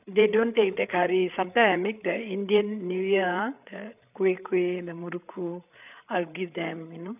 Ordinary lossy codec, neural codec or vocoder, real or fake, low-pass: none; codec, 16 kHz, 8 kbps, FreqCodec, larger model; fake; 3.6 kHz